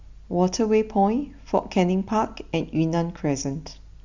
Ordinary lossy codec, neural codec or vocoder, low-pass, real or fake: Opus, 64 kbps; none; 7.2 kHz; real